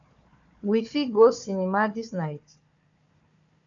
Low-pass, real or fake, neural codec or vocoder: 7.2 kHz; fake; codec, 16 kHz, 4 kbps, FunCodec, trained on Chinese and English, 50 frames a second